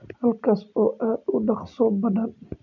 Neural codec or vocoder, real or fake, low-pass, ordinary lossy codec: none; real; 7.2 kHz; none